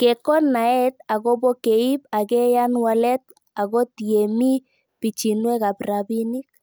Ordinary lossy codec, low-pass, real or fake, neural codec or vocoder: none; none; real; none